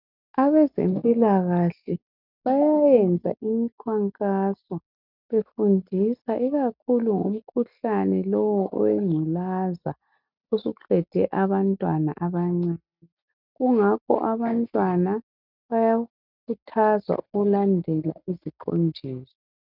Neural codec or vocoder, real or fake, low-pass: none; real; 5.4 kHz